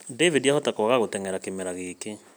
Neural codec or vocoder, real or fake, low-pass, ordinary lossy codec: none; real; none; none